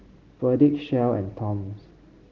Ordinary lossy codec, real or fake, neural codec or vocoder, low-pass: Opus, 16 kbps; real; none; 7.2 kHz